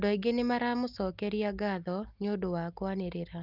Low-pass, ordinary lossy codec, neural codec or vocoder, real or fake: 5.4 kHz; Opus, 32 kbps; none; real